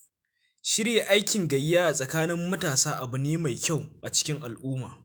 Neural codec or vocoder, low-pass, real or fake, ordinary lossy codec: autoencoder, 48 kHz, 128 numbers a frame, DAC-VAE, trained on Japanese speech; none; fake; none